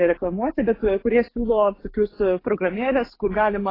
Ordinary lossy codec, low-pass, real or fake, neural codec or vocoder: AAC, 24 kbps; 5.4 kHz; fake; codec, 44.1 kHz, 7.8 kbps, DAC